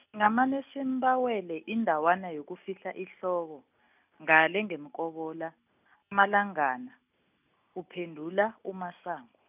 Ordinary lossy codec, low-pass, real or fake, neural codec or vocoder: none; 3.6 kHz; real; none